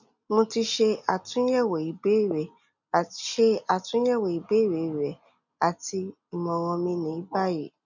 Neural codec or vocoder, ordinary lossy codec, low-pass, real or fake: none; none; 7.2 kHz; real